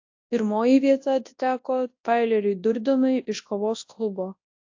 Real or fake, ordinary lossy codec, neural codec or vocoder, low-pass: fake; AAC, 48 kbps; codec, 24 kHz, 0.9 kbps, WavTokenizer, large speech release; 7.2 kHz